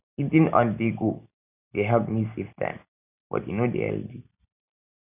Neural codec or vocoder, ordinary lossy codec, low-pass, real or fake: none; none; 3.6 kHz; real